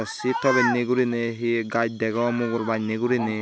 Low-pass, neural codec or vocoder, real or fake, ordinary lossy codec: none; none; real; none